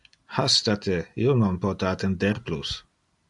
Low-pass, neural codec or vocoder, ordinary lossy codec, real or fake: 10.8 kHz; none; AAC, 64 kbps; real